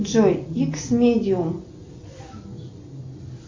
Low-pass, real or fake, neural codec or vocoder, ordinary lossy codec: 7.2 kHz; real; none; MP3, 64 kbps